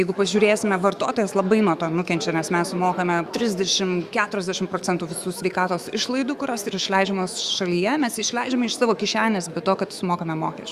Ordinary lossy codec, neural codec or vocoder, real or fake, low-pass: Opus, 64 kbps; autoencoder, 48 kHz, 128 numbers a frame, DAC-VAE, trained on Japanese speech; fake; 14.4 kHz